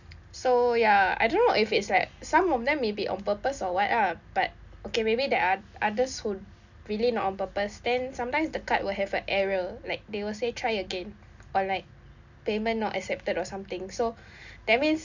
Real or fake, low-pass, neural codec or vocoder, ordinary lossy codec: real; 7.2 kHz; none; none